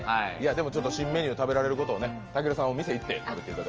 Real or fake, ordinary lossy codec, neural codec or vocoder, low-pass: real; Opus, 24 kbps; none; 7.2 kHz